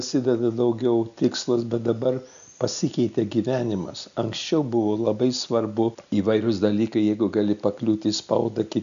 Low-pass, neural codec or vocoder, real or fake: 7.2 kHz; none; real